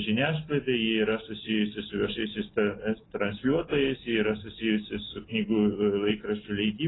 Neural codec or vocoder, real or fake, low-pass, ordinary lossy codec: none; real; 7.2 kHz; AAC, 16 kbps